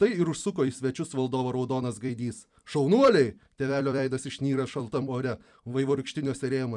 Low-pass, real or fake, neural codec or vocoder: 10.8 kHz; fake; vocoder, 44.1 kHz, 128 mel bands every 256 samples, BigVGAN v2